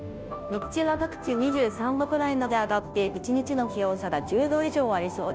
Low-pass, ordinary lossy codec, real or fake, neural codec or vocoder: none; none; fake; codec, 16 kHz, 0.5 kbps, FunCodec, trained on Chinese and English, 25 frames a second